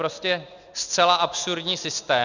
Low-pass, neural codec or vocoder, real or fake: 7.2 kHz; none; real